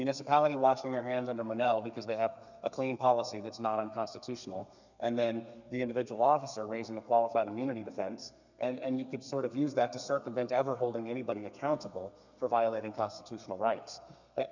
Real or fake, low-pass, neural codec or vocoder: fake; 7.2 kHz; codec, 32 kHz, 1.9 kbps, SNAC